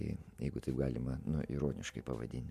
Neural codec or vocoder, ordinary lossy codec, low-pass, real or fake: none; MP3, 64 kbps; 14.4 kHz; real